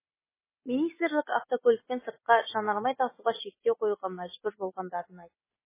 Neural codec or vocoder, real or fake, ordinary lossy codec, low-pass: none; real; MP3, 16 kbps; 3.6 kHz